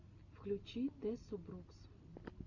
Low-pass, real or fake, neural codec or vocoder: 7.2 kHz; real; none